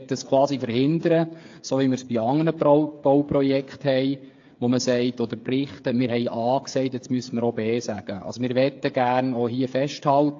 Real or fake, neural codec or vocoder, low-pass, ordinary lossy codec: fake; codec, 16 kHz, 8 kbps, FreqCodec, smaller model; 7.2 kHz; AAC, 48 kbps